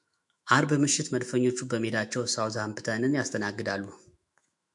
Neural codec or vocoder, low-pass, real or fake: autoencoder, 48 kHz, 128 numbers a frame, DAC-VAE, trained on Japanese speech; 10.8 kHz; fake